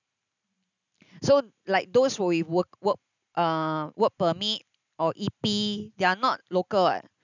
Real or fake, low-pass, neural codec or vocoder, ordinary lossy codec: real; 7.2 kHz; none; none